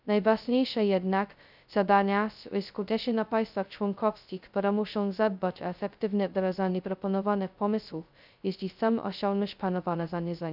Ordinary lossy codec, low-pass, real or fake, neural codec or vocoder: none; 5.4 kHz; fake; codec, 16 kHz, 0.2 kbps, FocalCodec